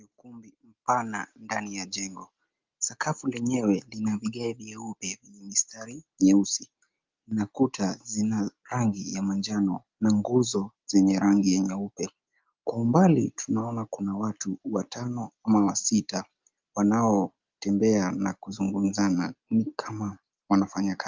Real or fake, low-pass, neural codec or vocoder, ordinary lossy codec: real; 7.2 kHz; none; Opus, 32 kbps